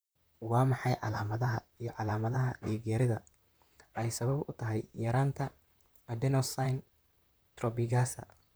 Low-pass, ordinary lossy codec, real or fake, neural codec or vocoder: none; none; fake; vocoder, 44.1 kHz, 128 mel bands, Pupu-Vocoder